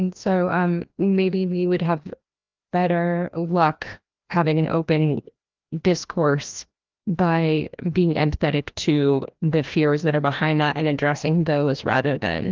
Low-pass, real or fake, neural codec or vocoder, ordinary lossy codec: 7.2 kHz; fake; codec, 16 kHz, 1 kbps, FreqCodec, larger model; Opus, 32 kbps